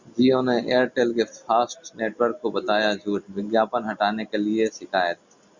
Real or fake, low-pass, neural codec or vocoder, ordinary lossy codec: real; 7.2 kHz; none; Opus, 64 kbps